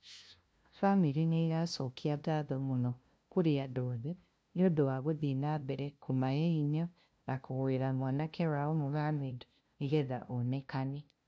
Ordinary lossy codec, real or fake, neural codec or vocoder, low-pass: none; fake; codec, 16 kHz, 0.5 kbps, FunCodec, trained on LibriTTS, 25 frames a second; none